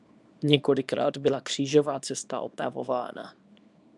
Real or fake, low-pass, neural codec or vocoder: fake; 10.8 kHz; codec, 24 kHz, 0.9 kbps, WavTokenizer, small release